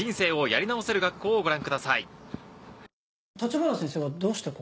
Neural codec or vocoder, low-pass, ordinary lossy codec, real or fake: none; none; none; real